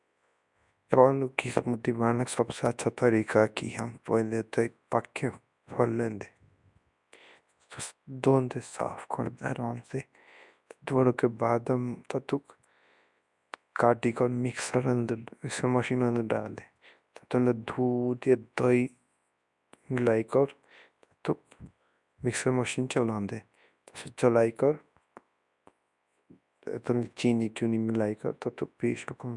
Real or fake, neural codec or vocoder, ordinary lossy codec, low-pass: fake; codec, 24 kHz, 0.9 kbps, WavTokenizer, large speech release; MP3, 96 kbps; 10.8 kHz